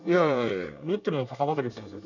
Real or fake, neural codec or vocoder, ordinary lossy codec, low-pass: fake; codec, 24 kHz, 1 kbps, SNAC; none; 7.2 kHz